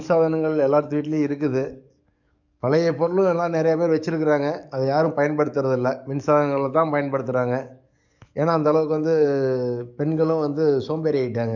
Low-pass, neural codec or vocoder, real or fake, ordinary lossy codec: 7.2 kHz; codec, 44.1 kHz, 7.8 kbps, DAC; fake; none